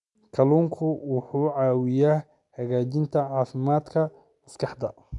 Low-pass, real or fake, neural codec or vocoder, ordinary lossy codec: 10.8 kHz; real; none; none